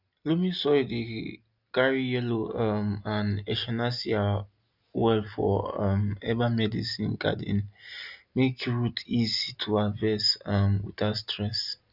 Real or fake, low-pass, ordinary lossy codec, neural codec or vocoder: real; 5.4 kHz; none; none